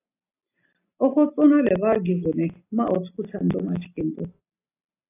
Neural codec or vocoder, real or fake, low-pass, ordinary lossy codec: none; real; 3.6 kHz; AAC, 24 kbps